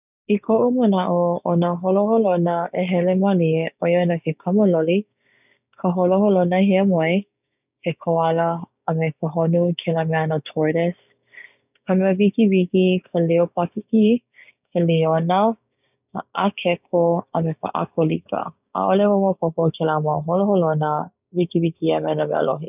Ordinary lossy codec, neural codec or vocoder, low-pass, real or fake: none; codec, 16 kHz, 6 kbps, DAC; 3.6 kHz; fake